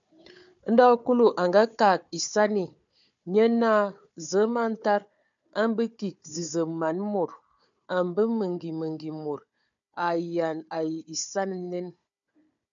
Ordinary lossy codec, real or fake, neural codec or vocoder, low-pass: MP3, 64 kbps; fake; codec, 16 kHz, 4 kbps, FunCodec, trained on Chinese and English, 50 frames a second; 7.2 kHz